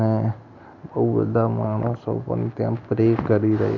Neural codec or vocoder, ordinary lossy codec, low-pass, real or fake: none; none; 7.2 kHz; real